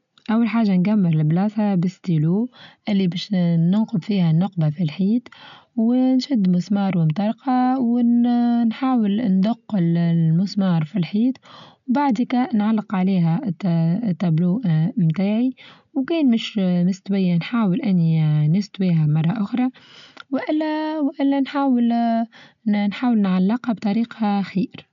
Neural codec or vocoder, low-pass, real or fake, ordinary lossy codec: none; 7.2 kHz; real; none